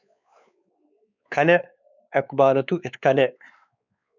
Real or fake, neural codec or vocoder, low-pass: fake; codec, 16 kHz, 4 kbps, X-Codec, WavLM features, trained on Multilingual LibriSpeech; 7.2 kHz